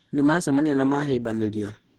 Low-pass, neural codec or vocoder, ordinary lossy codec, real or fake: 19.8 kHz; codec, 44.1 kHz, 2.6 kbps, DAC; Opus, 16 kbps; fake